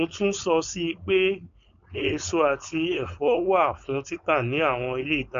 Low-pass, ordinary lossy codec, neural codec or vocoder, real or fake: 7.2 kHz; MP3, 64 kbps; codec, 16 kHz, 4.8 kbps, FACodec; fake